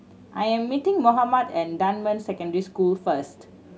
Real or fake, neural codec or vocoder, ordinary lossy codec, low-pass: real; none; none; none